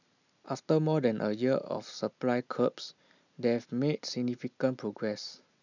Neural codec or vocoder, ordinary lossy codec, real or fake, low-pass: none; none; real; 7.2 kHz